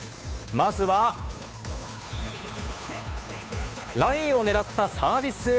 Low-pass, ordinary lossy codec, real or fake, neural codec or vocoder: none; none; fake; codec, 16 kHz, 2 kbps, FunCodec, trained on Chinese and English, 25 frames a second